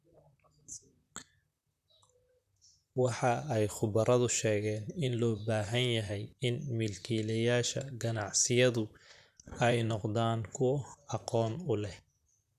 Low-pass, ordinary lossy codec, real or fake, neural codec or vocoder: 14.4 kHz; none; fake; vocoder, 44.1 kHz, 128 mel bands, Pupu-Vocoder